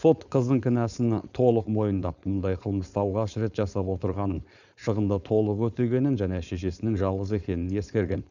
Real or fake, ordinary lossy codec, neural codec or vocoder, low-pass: fake; none; codec, 16 kHz, 4.8 kbps, FACodec; 7.2 kHz